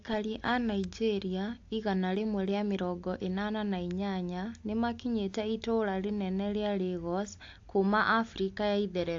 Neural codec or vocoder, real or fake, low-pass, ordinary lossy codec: none; real; 7.2 kHz; AAC, 48 kbps